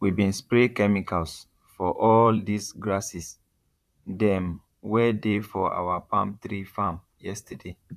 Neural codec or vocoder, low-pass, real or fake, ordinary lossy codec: vocoder, 44.1 kHz, 128 mel bands, Pupu-Vocoder; 14.4 kHz; fake; none